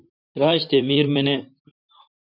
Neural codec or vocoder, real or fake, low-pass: vocoder, 44.1 kHz, 128 mel bands, Pupu-Vocoder; fake; 5.4 kHz